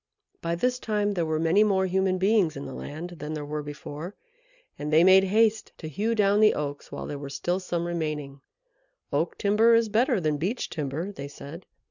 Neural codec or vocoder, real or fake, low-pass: none; real; 7.2 kHz